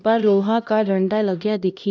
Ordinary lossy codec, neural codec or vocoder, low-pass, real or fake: none; codec, 16 kHz, 1 kbps, X-Codec, HuBERT features, trained on LibriSpeech; none; fake